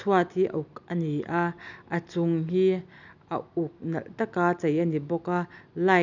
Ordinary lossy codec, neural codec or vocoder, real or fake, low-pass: none; none; real; 7.2 kHz